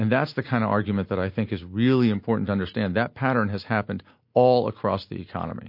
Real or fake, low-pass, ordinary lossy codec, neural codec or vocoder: real; 5.4 kHz; MP3, 32 kbps; none